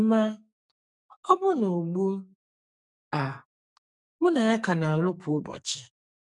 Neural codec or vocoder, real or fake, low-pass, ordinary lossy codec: codec, 44.1 kHz, 2.6 kbps, SNAC; fake; 10.8 kHz; none